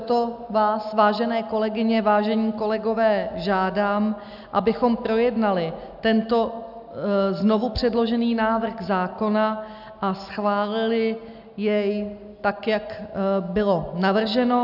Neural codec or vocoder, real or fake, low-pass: none; real; 5.4 kHz